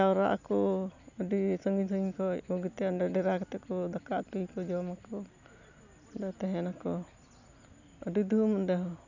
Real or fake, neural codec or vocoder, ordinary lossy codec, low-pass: real; none; none; 7.2 kHz